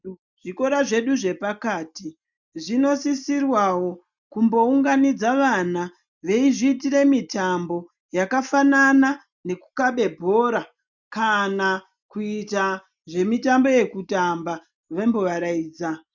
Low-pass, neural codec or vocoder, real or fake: 7.2 kHz; none; real